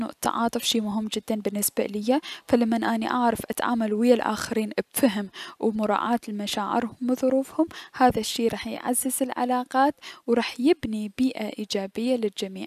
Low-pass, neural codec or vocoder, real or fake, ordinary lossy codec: 14.4 kHz; none; real; none